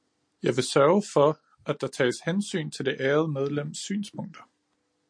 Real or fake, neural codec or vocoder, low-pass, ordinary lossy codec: real; none; 9.9 kHz; MP3, 48 kbps